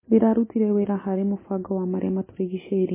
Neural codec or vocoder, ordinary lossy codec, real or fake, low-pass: none; MP3, 16 kbps; real; 3.6 kHz